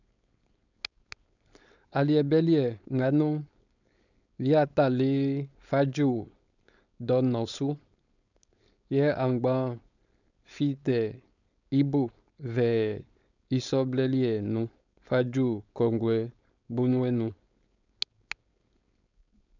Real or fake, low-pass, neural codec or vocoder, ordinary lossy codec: fake; 7.2 kHz; codec, 16 kHz, 4.8 kbps, FACodec; none